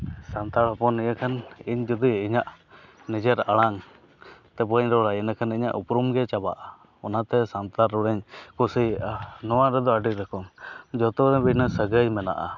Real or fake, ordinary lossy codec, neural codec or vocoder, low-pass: real; none; none; 7.2 kHz